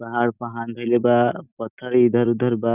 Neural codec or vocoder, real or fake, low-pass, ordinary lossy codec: none; real; 3.6 kHz; none